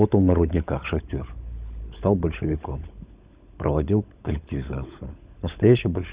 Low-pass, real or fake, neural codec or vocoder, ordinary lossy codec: 3.6 kHz; fake; codec, 16 kHz, 16 kbps, FunCodec, trained on LibriTTS, 50 frames a second; Opus, 64 kbps